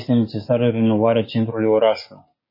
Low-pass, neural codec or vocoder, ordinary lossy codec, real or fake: 5.4 kHz; codec, 16 kHz, 6 kbps, DAC; MP3, 24 kbps; fake